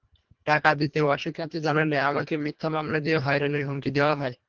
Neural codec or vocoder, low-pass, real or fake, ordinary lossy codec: codec, 24 kHz, 1.5 kbps, HILCodec; 7.2 kHz; fake; Opus, 32 kbps